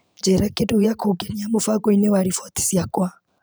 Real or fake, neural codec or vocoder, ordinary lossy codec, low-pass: fake; vocoder, 44.1 kHz, 128 mel bands every 512 samples, BigVGAN v2; none; none